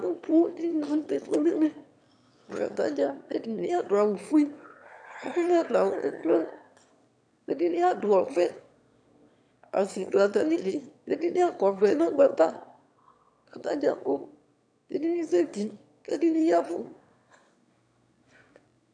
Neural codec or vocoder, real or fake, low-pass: autoencoder, 22.05 kHz, a latent of 192 numbers a frame, VITS, trained on one speaker; fake; 9.9 kHz